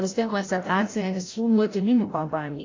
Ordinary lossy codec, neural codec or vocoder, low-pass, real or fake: AAC, 32 kbps; codec, 16 kHz, 0.5 kbps, FreqCodec, larger model; 7.2 kHz; fake